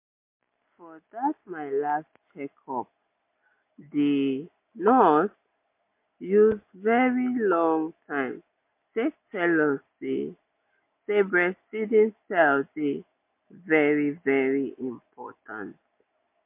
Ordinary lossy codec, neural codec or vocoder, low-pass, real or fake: MP3, 24 kbps; none; 3.6 kHz; real